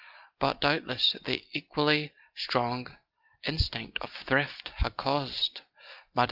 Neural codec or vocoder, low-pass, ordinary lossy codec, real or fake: none; 5.4 kHz; Opus, 24 kbps; real